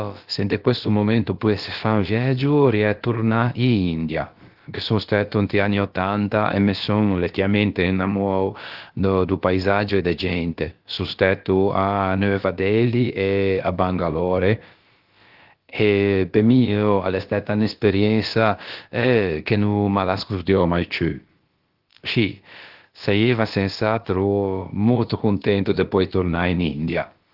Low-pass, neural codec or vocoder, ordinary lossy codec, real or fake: 5.4 kHz; codec, 16 kHz, about 1 kbps, DyCAST, with the encoder's durations; Opus, 16 kbps; fake